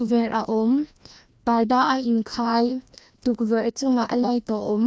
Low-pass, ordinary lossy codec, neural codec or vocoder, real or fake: none; none; codec, 16 kHz, 1 kbps, FreqCodec, larger model; fake